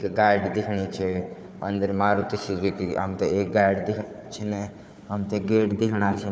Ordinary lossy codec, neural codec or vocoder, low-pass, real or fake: none; codec, 16 kHz, 4 kbps, FunCodec, trained on Chinese and English, 50 frames a second; none; fake